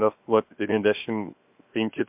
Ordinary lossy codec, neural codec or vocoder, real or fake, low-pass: MP3, 32 kbps; codec, 16 kHz, 0.7 kbps, FocalCodec; fake; 3.6 kHz